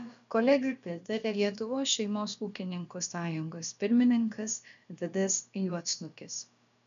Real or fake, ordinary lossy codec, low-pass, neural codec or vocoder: fake; MP3, 96 kbps; 7.2 kHz; codec, 16 kHz, about 1 kbps, DyCAST, with the encoder's durations